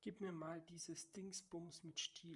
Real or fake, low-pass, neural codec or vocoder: fake; 10.8 kHz; vocoder, 44.1 kHz, 128 mel bands, Pupu-Vocoder